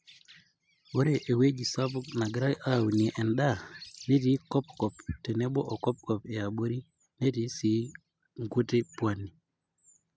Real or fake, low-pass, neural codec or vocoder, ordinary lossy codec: real; none; none; none